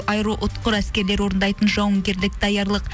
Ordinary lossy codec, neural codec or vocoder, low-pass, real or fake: none; none; none; real